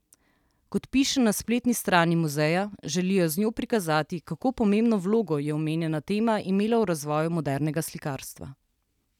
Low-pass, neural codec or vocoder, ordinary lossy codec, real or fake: 19.8 kHz; none; none; real